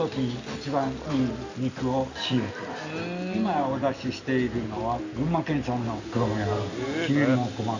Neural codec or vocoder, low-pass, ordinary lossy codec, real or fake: none; 7.2 kHz; Opus, 64 kbps; real